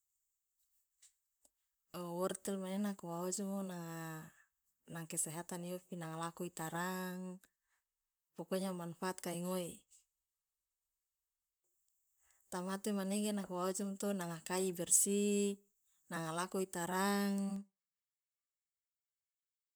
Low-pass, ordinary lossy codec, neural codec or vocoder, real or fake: none; none; vocoder, 44.1 kHz, 128 mel bands, Pupu-Vocoder; fake